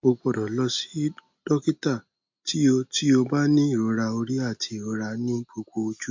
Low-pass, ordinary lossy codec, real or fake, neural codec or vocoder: 7.2 kHz; MP3, 48 kbps; real; none